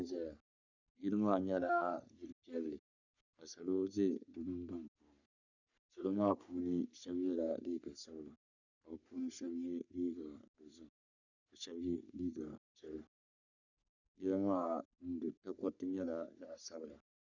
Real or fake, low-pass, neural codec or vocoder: fake; 7.2 kHz; codec, 44.1 kHz, 3.4 kbps, Pupu-Codec